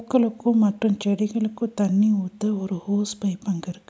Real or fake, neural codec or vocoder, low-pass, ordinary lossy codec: real; none; none; none